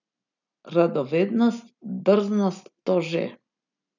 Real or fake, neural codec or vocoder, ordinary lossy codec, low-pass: real; none; none; 7.2 kHz